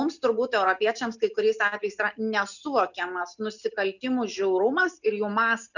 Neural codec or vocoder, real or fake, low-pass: none; real; 7.2 kHz